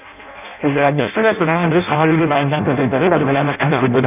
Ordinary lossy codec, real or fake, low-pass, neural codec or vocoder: none; fake; 3.6 kHz; codec, 16 kHz in and 24 kHz out, 0.6 kbps, FireRedTTS-2 codec